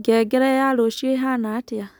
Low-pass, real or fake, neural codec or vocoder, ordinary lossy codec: none; real; none; none